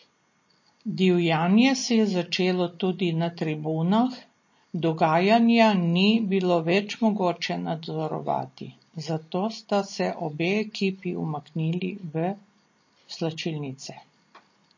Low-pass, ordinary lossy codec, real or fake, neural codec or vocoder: 7.2 kHz; MP3, 32 kbps; real; none